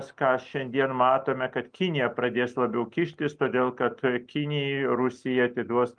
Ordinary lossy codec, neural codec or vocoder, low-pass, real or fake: Opus, 32 kbps; none; 9.9 kHz; real